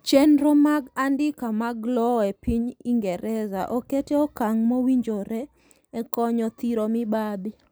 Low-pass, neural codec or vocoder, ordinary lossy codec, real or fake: none; none; none; real